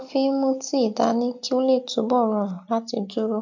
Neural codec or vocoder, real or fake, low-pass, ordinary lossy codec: none; real; 7.2 kHz; MP3, 64 kbps